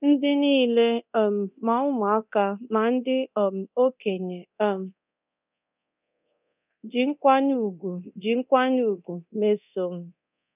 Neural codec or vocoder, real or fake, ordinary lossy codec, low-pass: codec, 24 kHz, 0.9 kbps, DualCodec; fake; none; 3.6 kHz